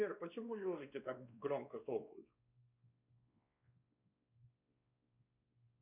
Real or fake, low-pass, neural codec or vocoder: fake; 3.6 kHz; codec, 16 kHz, 2 kbps, X-Codec, WavLM features, trained on Multilingual LibriSpeech